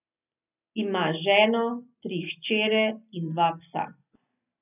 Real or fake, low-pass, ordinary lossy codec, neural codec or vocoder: real; 3.6 kHz; none; none